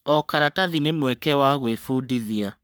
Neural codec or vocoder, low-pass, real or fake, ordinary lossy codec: codec, 44.1 kHz, 3.4 kbps, Pupu-Codec; none; fake; none